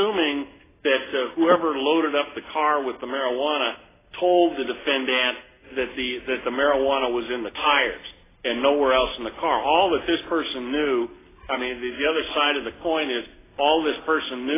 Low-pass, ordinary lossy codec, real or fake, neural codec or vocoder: 3.6 kHz; AAC, 16 kbps; real; none